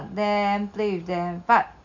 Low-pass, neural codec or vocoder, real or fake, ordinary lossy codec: 7.2 kHz; none; real; none